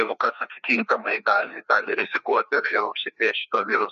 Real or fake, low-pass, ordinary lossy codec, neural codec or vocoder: fake; 7.2 kHz; MP3, 48 kbps; codec, 16 kHz, 2 kbps, FreqCodec, larger model